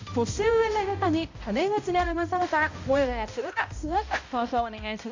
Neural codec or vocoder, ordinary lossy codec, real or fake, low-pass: codec, 16 kHz, 0.5 kbps, X-Codec, HuBERT features, trained on balanced general audio; AAC, 48 kbps; fake; 7.2 kHz